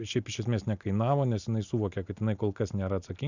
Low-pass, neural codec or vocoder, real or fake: 7.2 kHz; none; real